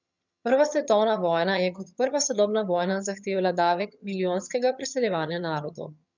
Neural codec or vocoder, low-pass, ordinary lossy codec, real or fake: vocoder, 22.05 kHz, 80 mel bands, HiFi-GAN; 7.2 kHz; none; fake